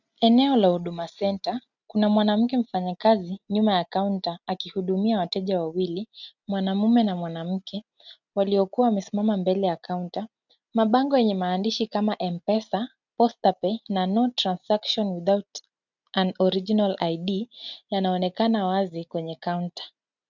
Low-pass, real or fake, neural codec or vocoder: 7.2 kHz; real; none